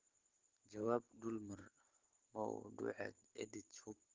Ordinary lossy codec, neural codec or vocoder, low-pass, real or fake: Opus, 16 kbps; vocoder, 44.1 kHz, 128 mel bands every 512 samples, BigVGAN v2; 7.2 kHz; fake